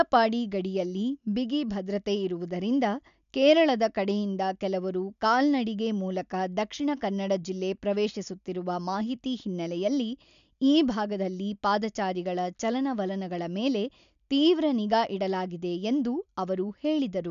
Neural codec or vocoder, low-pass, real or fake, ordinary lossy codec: none; 7.2 kHz; real; none